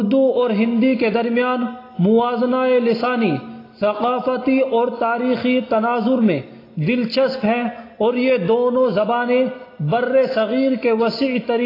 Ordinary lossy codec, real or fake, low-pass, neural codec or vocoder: AAC, 24 kbps; real; 5.4 kHz; none